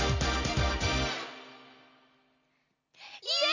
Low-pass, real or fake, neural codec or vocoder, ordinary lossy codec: 7.2 kHz; real; none; none